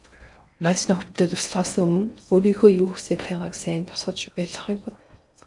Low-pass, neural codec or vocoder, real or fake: 10.8 kHz; codec, 16 kHz in and 24 kHz out, 0.6 kbps, FocalCodec, streaming, 4096 codes; fake